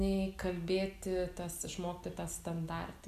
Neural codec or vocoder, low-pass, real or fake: none; 14.4 kHz; real